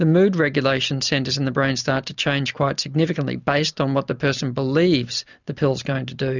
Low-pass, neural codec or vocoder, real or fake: 7.2 kHz; none; real